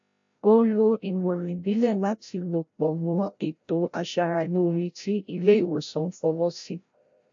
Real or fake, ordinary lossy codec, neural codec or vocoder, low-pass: fake; AAC, 64 kbps; codec, 16 kHz, 0.5 kbps, FreqCodec, larger model; 7.2 kHz